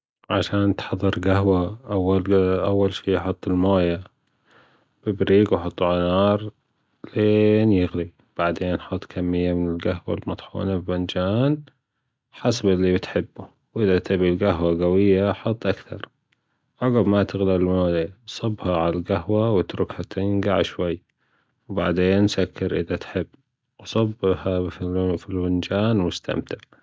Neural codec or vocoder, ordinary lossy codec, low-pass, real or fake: none; none; none; real